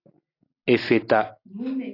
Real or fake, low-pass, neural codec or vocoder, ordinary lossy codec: real; 5.4 kHz; none; AAC, 32 kbps